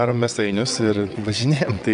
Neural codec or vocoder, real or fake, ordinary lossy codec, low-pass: vocoder, 22.05 kHz, 80 mel bands, WaveNeXt; fake; AAC, 96 kbps; 9.9 kHz